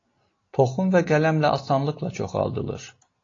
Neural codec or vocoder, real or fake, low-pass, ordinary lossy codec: none; real; 7.2 kHz; AAC, 32 kbps